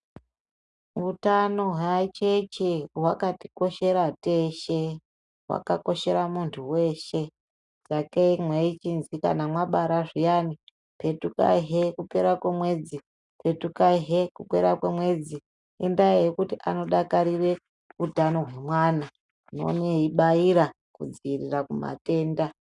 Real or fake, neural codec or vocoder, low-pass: real; none; 10.8 kHz